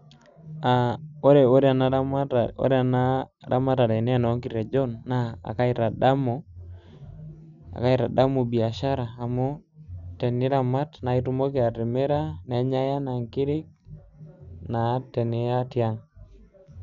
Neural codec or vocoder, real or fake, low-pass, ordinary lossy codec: none; real; 7.2 kHz; none